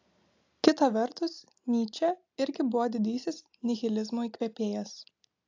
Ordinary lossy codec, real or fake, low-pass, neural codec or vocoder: AAC, 48 kbps; real; 7.2 kHz; none